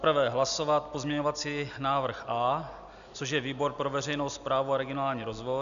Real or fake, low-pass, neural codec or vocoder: real; 7.2 kHz; none